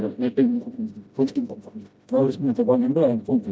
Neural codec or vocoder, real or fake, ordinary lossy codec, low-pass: codec, 16 kHz, 0.5 kbps, FreqCodec, smaller model; fake; none; none